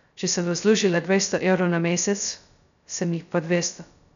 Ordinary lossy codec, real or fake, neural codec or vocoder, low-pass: MP3, 96 kbps; fake; codec, 16 kHz, 0.2 kbps, FocalCodec; 7.2 kHz